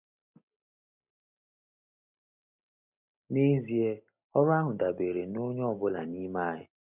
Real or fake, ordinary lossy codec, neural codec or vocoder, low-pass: real; none; none; 3.6 kHz